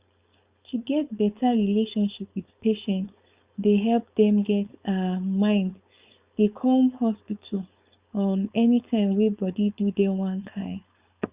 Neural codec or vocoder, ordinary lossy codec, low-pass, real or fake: codec, 16 kHz, 4.8 kbps, FACodec; Opus, 64 kbps; 3.6 kHz; fake